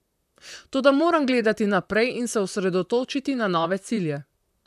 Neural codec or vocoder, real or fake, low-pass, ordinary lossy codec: vocoder, 44.1 kHz, 128 mel bands, Pupu-Vocoder; fake; 14.4 kHz; none